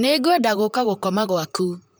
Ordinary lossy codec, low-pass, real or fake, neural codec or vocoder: none; none; fake; vocoder, 44.1 kHz, 128 mel bands, Pupu-Vocoder